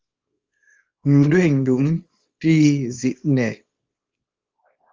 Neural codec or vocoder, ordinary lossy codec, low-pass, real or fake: codec, 24 kHz, 0.9 kbps, WavTokenizer, small release; Opus, 32 kbps; 7.2 kHz; fake